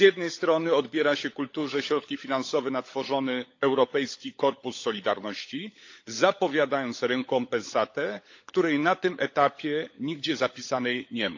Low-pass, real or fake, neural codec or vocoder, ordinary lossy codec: 7.2 kHz; fake; codec, 16 kHz, 16 kbps, FunCodec, trained on LibriTTS, 50 frames a second; AAC, 48 kbps